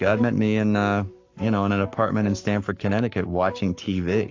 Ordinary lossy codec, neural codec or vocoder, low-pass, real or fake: AAC, 48 kbps; codec, 44.1 kHz, 7.8 kbps, Pupu-Codec; 7.2 kHz; fake